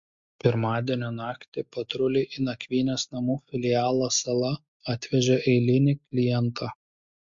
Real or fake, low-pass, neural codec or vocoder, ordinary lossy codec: real; 7.2 kHz; none; MP3, 48 kbps